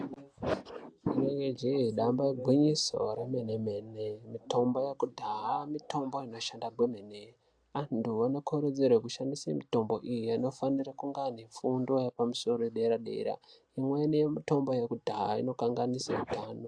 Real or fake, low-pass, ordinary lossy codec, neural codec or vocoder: real; 9.9 kHz; AAC, 64 kbps; none